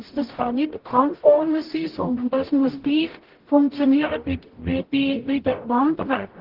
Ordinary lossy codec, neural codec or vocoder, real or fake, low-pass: Opus, 16 kbps; codec, 44.1 kHz, 0.9 kbps, DAC; fake; 5.4 kHz